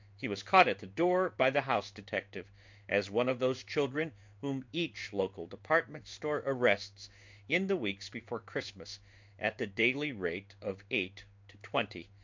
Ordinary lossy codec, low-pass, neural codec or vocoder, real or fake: MP3, 64 kbps; 7.2 kHz; codec, 16 kHz in and 24 kHz out, 1 kbps, XY-Tokenizer; fake